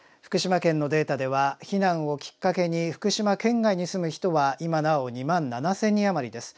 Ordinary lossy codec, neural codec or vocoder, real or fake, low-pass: none; none; real; none